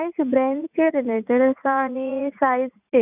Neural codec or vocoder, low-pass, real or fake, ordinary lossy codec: vocoder, 44.1 kHz, 80 mel bands, Vocos; 3.6 kHz; fake; none